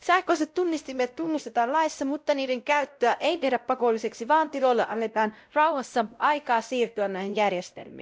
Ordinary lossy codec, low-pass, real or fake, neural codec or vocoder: none; none; fake; codec, 16 kHz, 0.5 kbps, X-Codec, WavLM features, trained on Multilingual LibriSpeech